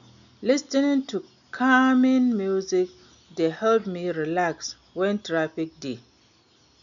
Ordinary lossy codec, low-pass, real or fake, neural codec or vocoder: none; 7.2 kHz; real; none